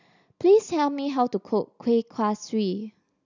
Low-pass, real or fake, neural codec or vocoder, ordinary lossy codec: 7.2 kHz; real; none; none